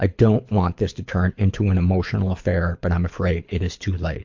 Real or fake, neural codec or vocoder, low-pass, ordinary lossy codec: fake; codec, 24 kHz, 6 kbps, HILCodec; 7.2 kHz; MP3, 48 kbps